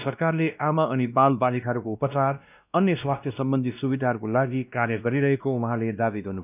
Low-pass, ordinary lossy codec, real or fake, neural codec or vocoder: 3.6 kHz; none; fake; codec, 16 kHz, 1 kbps, X-Codec, WavLM features, trained on Multilingual LibriSpeech